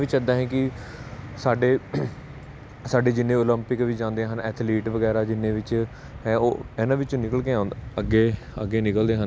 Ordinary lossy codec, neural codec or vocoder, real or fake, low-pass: none; none; real; none